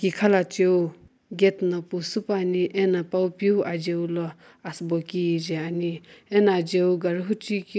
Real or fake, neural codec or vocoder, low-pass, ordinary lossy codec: real; none; none; none